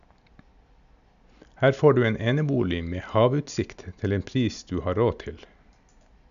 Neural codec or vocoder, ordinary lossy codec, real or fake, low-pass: none; none; real; 7.2 kHz